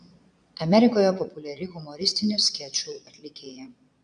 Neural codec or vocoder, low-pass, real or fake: vocoder, 22.05 kHz, 80 mel bands, Vocos; 9.9 kHz; fake